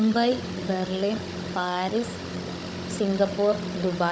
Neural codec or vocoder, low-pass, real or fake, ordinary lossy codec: codec, 16 kHz, 16 kbps, FunCodec, trained on Chinese and English, 50 frames a second; none; fake; none